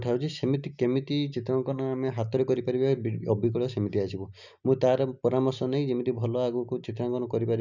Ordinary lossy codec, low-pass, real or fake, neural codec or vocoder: none; 7.2 kHz; real; none